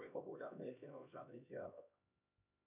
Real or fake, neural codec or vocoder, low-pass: fake; codec, 16 kHz, 1 kbps, X-Codec, HuBERT features, trained on LibriSpeech; 3.6 kHz